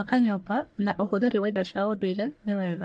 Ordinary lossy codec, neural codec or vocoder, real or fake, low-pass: AAC, 64 kbps; codec, 44.1 kHz, 1.7 kbps, Pupu-Codec; fake; 9.9 kHz